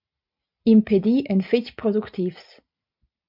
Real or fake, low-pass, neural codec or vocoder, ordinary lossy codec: real; 5.4 kHz; none; MP3, 48 kbps